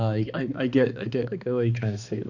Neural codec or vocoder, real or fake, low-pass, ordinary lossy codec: codec, 16 kHz, 2 kbps, X-Codec, HuBERT features, trained on balanced general audio; fake; 7.2 kHz; none